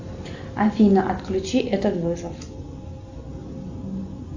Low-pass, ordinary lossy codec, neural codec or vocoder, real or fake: 7.2 kHz; AAC, 48 kbps; none; real